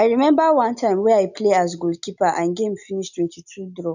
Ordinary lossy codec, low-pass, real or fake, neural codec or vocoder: none; 7.2 kHz; real; none